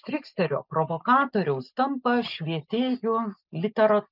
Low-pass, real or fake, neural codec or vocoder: 5.4 kHz; real; none